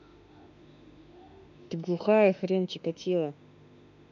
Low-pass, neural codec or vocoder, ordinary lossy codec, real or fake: 7.2 kHz; autoencoder, 48 kHz, 32 numbers a frame, DAC-VAE, trained on Japanese speech; none; fake